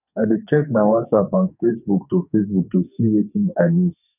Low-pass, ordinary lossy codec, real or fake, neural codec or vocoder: 3.6 kHz; none; fake; codec, 44.1 kHz, 2.6 kbps, SNAC